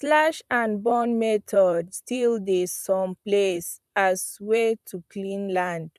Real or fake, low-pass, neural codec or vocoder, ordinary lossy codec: fake; 14.4 kHz; vocoder, 44.1 kHz, 128 mel bands, Pupu-Vocoder; none